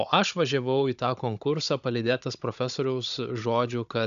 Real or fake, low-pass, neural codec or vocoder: fake; 7.2 kHz; codec, 16 kHz, 16 kbps, FunCodec, trained on Chinese and English, 50 frames a second